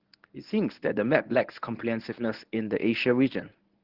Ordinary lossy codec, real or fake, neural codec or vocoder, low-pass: Opus, 16 kbps; fake; codec, 24 kHz, 0.9 kbps, WavTokenizer, medium speech release version 1; 5.4 kHz